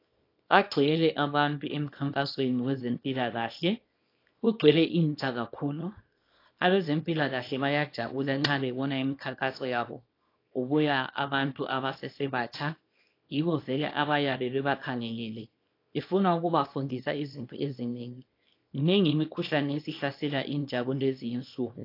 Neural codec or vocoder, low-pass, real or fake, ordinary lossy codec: codec, 24 kHz, 0.9 kbps, WavTokenizer, small release; 5.4 kHz; fake; AAC, 32 kbps